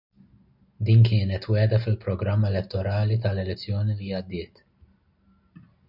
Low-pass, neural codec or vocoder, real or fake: 5.4 kHz; none; real